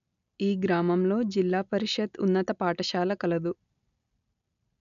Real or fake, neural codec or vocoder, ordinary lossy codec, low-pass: real; none; none; 7.2 kHz